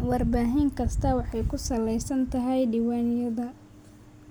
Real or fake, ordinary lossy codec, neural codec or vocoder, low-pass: real; none; none; none